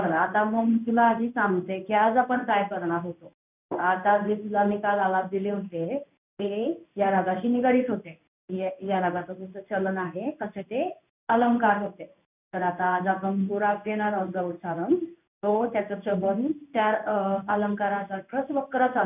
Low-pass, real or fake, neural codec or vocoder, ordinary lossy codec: 3.6 kHz; fake; codec, 16 kHz in and 24 kHz out, 1 kbps, XY-Tokenizer; none